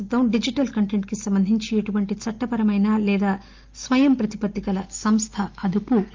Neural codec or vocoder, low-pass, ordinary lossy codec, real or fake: none; 7.2 kHz; Opus, 32 kbps; real